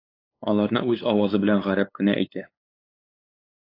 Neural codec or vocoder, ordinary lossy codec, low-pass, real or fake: codec, 16 kHz, 8 kbps, FunCodec, trained on LibriTTS, 25 frames a second; AAC, 32 kbps; 5.4 kHz; fake